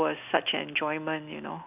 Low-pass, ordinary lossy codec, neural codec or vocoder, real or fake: 3.6 kHz; none; none; real